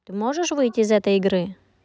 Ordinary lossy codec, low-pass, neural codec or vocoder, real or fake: none; none; none; real